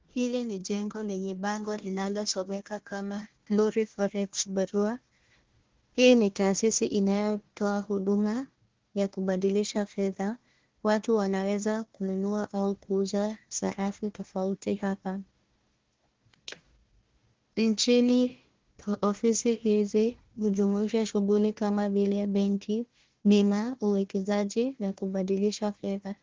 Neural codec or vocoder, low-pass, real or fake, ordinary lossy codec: codec, 16 kHz, 1 kbps, FunCodec, trained on Chinese and English, 50 frames a second; 7.2 kHz; fake; Opus, 16 kbps